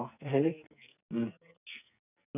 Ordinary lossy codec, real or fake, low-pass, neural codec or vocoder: none; fake; 3.6 kHz; codec, 44.1 kHz, 2.6 kbps, SNAC